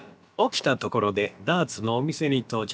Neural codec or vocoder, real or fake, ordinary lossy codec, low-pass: codec, 16 kHz, about 1 kbps, DyCAST, with the encoder's durations; fake; none; none